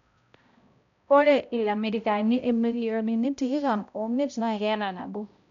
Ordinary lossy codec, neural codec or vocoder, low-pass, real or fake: none; codec, 16 kHz, 0.5 kbps, X-Codec, HuBERT features, trained on balanced general audio; 7.2 kHz; fake